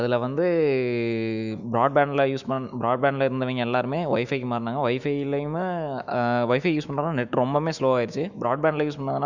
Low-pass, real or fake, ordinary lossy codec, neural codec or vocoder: 7.2 kHz; fake; none; autoencoder, 48 kHz, 128 numbers a frame, DAC-VAE, trained on Japanese speech